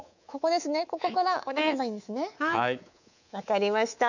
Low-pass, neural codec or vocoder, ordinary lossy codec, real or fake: 7.2 kHz; codec, 24 kHz, 3.1 kbps, DualCodec; none; fake